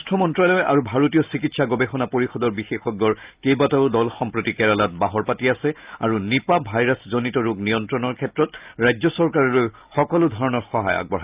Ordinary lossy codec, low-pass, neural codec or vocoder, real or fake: Opus, 24 kbps; 3.6 kHz; none; real